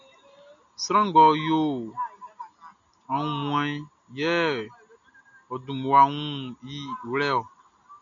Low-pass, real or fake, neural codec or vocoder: 7.2 kHz; real; none